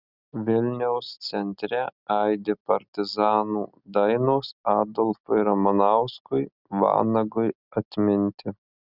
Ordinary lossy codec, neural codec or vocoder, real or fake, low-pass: Opus, 64 kbps; none; real; 5.4 kHz